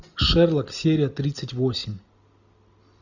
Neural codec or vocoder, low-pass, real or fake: none; 7.2 kHz; real